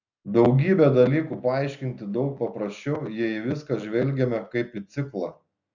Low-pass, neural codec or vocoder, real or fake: 7.2 kHz; none; real